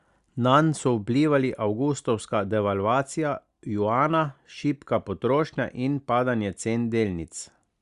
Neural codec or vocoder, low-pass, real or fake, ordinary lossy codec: none; 10.8 kHz; real; Opus, 64 kbps